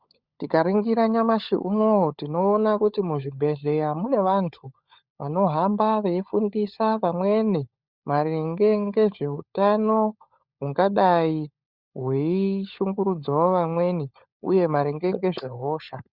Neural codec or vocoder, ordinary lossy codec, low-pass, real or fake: codec, 16 kHz, 16 kbps, FunCodec, trained on LibriTTS, 50 frames a second; Opus, 64 kbps; 5.4 kHz; fake